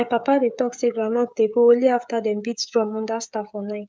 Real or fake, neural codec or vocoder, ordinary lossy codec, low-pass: fake; codec, 16 kHz, 16 kbps, FreqCodec, smaller model; none; none